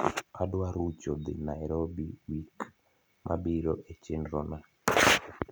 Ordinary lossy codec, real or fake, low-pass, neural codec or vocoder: none; real; none; none